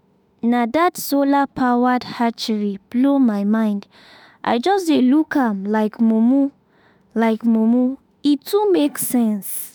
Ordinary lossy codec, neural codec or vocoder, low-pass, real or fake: none; autoencoder, 48 kHz, 32 numbers a frame, DAC-VAE, trained on Japanese speech; none; fake